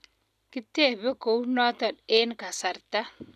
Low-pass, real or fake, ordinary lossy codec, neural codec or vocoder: 14.4 kHz; real; none; none